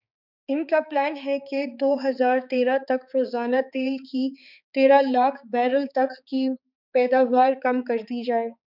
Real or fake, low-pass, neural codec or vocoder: fake; 5.4 kHz; codec, 16 kHz, 4 kbps, X-Codec, HuBERT features, trained on balanced general audio